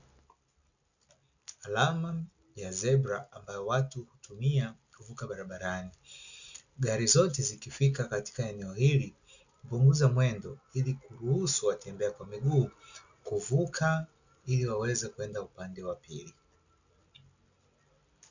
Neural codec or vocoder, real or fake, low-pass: none; real; 7.2 kHz